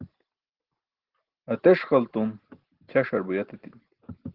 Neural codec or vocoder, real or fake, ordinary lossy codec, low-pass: none; real; Opus, 16 kbps; 5.4 kHz